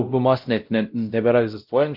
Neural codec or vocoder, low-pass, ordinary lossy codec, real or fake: codec, 16 kHz, 0.5 kbps, X-Codec, WavLM features, trained on Multilingual LibriSpeech; 5.4 kHz; Opus, 32 kbps; fake